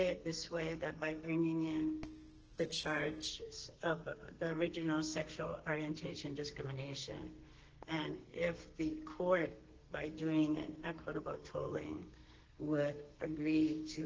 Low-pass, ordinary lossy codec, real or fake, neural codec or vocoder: 7.2 kHz; Opus, 16 kbps; fake; codec, 32 kHz, 1.9 kbps, SNAC